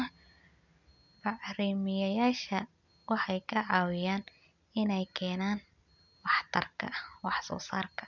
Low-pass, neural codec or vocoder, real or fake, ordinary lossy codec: 7.2 kHz; none; real; none